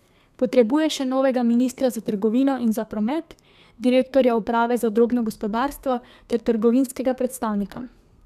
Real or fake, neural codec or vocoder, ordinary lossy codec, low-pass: fake; codec, 32 kHz, 1.9 kbps, SNAC; none; 14.4 kHz